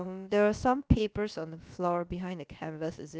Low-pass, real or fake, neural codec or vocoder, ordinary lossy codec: none; fake; codec, 16 kHz, about 1 kbps, DyCAST, with the encoder's durations; none